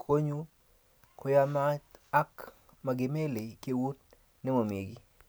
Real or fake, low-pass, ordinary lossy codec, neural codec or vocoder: real; none; none; none